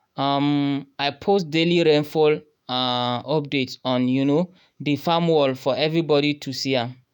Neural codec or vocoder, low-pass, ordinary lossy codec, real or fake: autoencoder, 48 kHz, 128 numbers a frame, DAC-VAE, trained on Japanese speech; 19.8 kHz; none; fake